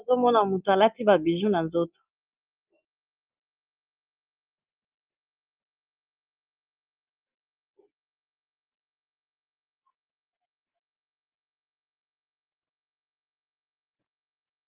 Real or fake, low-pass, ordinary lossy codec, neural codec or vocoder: real; 3.6 kHz; Opus, 24 kbps; none